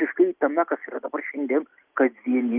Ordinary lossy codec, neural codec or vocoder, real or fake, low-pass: Opus, 24 kbps; none; real; 3.6 kHz